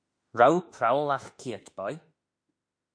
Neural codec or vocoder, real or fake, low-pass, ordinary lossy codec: autoencoder, 48 kHz, 32 numbers a frame, DAC-VAE, trained on Japanese speech; fake; 9.9 kHz; MP3, 48 kbps